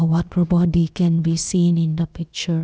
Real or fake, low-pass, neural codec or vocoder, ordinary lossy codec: fake; none; codec, 16 kHz, about 1 kbps, DyCAST, with the encoder's durations; none